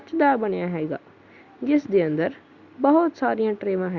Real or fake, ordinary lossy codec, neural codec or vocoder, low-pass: real; Opus, 64 kbps; none; 7.2 kHz